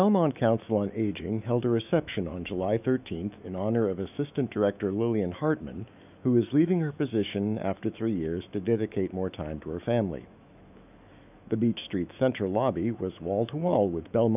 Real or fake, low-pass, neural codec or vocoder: fake; 3.6 kHz; autoencoder, 48 kHz, 128 numbers a frame, DAC-VAE, trained on Japanese speech